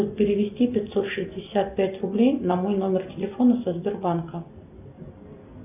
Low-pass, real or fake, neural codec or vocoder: 3.6 kHz; real; none